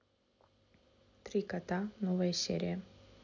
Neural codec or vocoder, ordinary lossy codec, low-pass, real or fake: none; none; 7.2 kHz; real